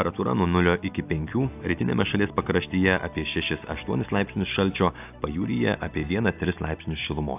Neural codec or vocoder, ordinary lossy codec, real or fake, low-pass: none; AAC, 32 kbps; real; 3.6 kHz